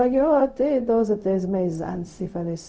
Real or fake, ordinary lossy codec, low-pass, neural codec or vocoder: fake; none; none; codec, 16 kHz, 0.4 kbps, LongCat-Audio-Codec